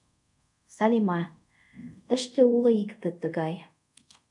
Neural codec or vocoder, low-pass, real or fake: codec, 24 kHz, 0.5 kbps, DualCodec; 10.8 kHz; fake